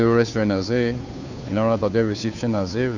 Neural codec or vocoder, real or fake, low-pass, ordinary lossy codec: codec, 16 kHz, 2 kbps, FunCodec, trained on Chinese and English, 25 frames a second; fake; 7.2 kHz; none